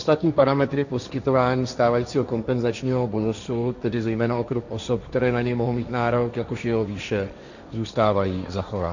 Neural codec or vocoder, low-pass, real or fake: codec, 16 kHz, 1.1 kbps, Voila-Tokenizer; 7.2 kHz; fake